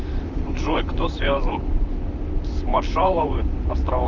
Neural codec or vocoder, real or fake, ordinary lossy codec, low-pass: vocoder, 44.1 kHz, 128 mel bands, Pupu-Vocoder; fake; Opus, 24 kbps; 7.2 kHz